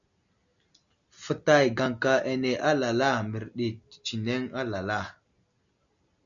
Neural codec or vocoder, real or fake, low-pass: none; real; 7.2 kHz